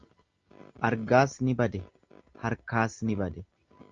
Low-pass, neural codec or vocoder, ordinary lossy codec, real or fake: 7.2 kHz; none; Opus, 32 kbps; real